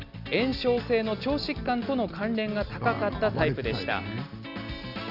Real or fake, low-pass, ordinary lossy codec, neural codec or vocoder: real; 5.4 kHz; none; none